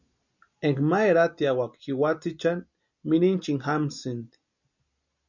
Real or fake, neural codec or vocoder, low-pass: real; none; 7.2 kHz